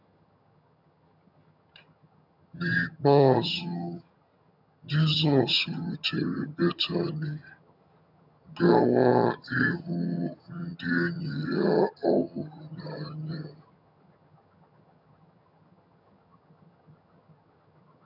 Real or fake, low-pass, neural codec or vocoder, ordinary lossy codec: fake; 5.4 kHz; vocoder, 22.05 kHz, 80 mel bands, HiFi-GAN; none